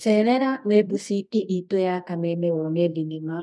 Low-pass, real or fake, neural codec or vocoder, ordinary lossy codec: none; fake; codec, 24 kHz, 0.9 kbps, WavTokenizer, medium music audio release; none